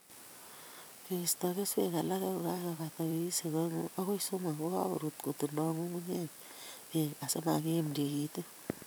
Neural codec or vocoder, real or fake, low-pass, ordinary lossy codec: vocoder, 44.1 kHz, 128 mel bands every 512 samples, BigVGAN v2; fake; none; none